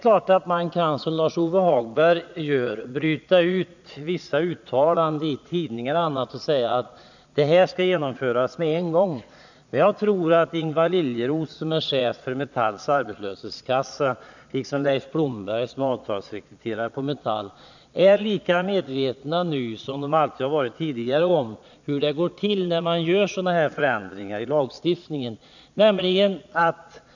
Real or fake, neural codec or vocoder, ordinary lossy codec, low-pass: fake; vocoder, 22.05 kHz, 80 mel bands, Vocos; none; 7.2 kHz